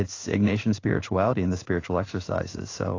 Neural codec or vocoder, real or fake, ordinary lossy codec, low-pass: codec, 16 kHz in and 24 kHz out, 1 kbps, XY-Tokenizer; fake; AAC, 32 kbps; 7.2 kHz